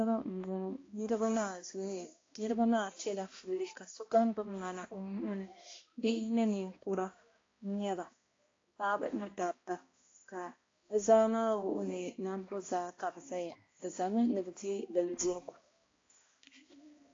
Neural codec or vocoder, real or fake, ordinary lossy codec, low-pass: codec, 16 kHz, 1 kbps, X-Codec, HuBERT features, trained on balanced general audio; fake; AAC, 32 kbps; 7.2 kHz